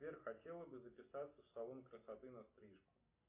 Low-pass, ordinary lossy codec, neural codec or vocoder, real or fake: 3.6 kHz; AAC, 32 kbps; none; real